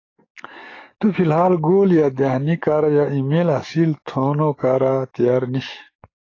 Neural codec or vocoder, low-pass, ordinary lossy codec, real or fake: codec, 44.1 kHz, 7.8 kbps, DAC; 7.2 kHz; AAC, 32 kbps; fake